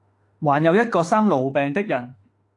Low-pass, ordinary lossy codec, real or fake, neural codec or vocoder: 10.8 kHz; AAC, 48 kbps; fake; autoencoder, 48 kHz, 32 numbers a frame, DAC-VAE, trained on Japanese speech